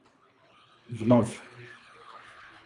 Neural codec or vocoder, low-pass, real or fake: codec, 24 kHz, 3 kbps, HILCodec; 10.8 kHz; fake